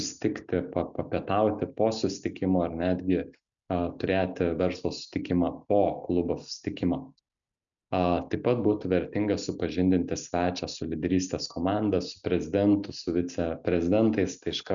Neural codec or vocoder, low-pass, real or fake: none; 7.2 kHz; real